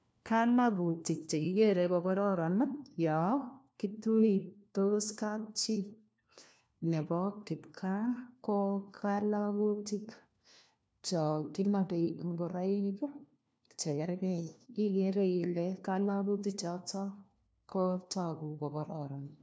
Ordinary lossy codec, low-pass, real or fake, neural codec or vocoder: none; none; fake; codec, 16 kHz, 1 kbps, FunCodec, trained on LibriTTS, 50 frames a second